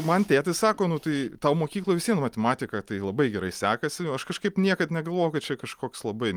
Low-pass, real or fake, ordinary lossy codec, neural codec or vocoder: 19.8 kHz; real; Opus, 32 kbps; none